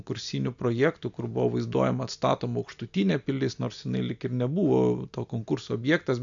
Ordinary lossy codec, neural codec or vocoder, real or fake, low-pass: MP3, 64 kbps; none; real; 7.2 kHz